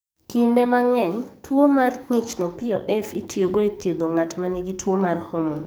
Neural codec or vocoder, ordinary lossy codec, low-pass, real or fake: codec, 44.1 kHz, 2.6 kbps, SNAC; none; none; fake